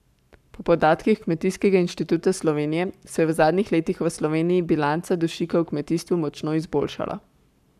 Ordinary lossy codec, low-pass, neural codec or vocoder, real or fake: none; 14.4 kHz; codec, 44.1 kHz, 7.8 kbps, Pupu-Codec; fake